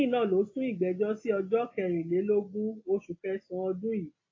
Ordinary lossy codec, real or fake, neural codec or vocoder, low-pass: AAC, 32 kbps; real; none; 7.2 kHz